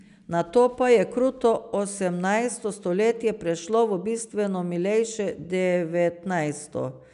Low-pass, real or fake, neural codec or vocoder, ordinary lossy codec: 10.8 kHz; real; none; none